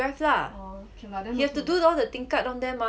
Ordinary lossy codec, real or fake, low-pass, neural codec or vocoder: none; real; none; none